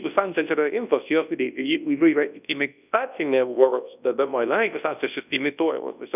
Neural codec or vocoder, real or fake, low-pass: codec, 24 kHz, 0.9 kbps, WavTokenizer, large speech release; fake; 3.6 kHz